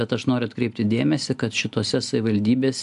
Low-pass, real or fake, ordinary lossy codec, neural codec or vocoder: 10.8 kHz; real; AAC, 96 kbps; none